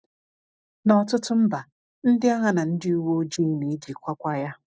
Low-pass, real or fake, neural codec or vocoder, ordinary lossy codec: none; real; none; none